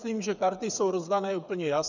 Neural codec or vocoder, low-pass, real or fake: codec, 16 kHz, 8 kbps, FreqCodec, smaller model; 7.2 kHz; fake